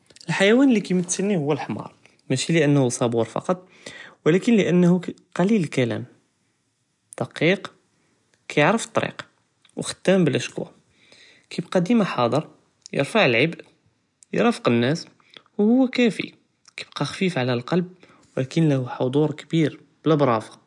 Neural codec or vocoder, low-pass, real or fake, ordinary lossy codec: none; 10.8 kHz; real; none